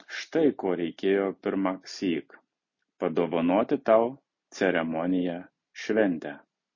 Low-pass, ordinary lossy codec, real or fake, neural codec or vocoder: 7.2 kHz; MP3, 32 kbps; real; none